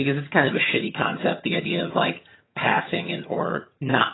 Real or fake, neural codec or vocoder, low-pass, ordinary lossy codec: fake; vocoder, 22.05 kHz, 80 mel bands, HiFi-GAN; 7.2 kHz; AAC, 16 kbps